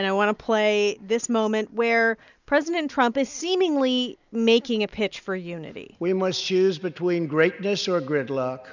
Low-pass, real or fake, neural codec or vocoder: 7.2 kHz; real; none